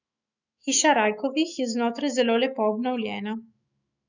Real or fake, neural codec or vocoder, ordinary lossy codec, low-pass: fake; autoencoder, 48 kHz, 128 numbers a frame, DAC-VAE, trained on Japanese speech; none; 7.2 kHz